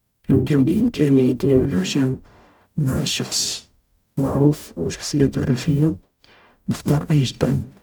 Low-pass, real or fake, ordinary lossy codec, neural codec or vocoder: 19.8 kHz; fake; none; codec, 44.1 kHz, 0.9 kbps, DAC